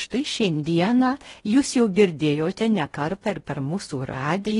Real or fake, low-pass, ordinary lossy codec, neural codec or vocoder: fake; 10.8 kHz; AAC, 32 kbps; codec, 16 kHz in and 24 kHz out, 0.6 kbps, FocalCodec, streaming, 4096 codes